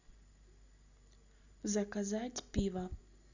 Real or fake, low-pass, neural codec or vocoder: real; 7.2 kHz; none